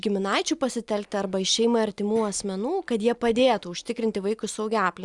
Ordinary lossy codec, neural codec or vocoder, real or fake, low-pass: Opus, 64 kbps; none; real; 10.8 kHz